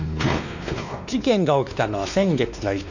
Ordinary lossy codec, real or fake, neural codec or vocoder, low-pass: none; fake; codec, 16 kHz, 2 kbps, X-Codec, WavLM features, trained on Multilingual LibriSpeech; 7.2 kHz